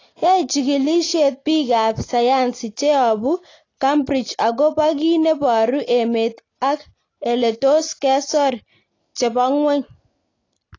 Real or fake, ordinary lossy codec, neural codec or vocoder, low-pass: real; AAC, 32 kbps; none; 7.2 kHz